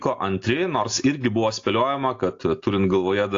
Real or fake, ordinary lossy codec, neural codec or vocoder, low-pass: real; AAC, 48 kbps; none; 7.2 kHz